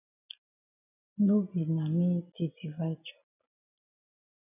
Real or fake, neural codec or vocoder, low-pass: real; none; 3.6 kHz